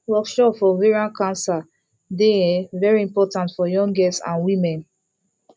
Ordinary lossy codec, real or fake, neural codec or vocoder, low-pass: none; real; none; none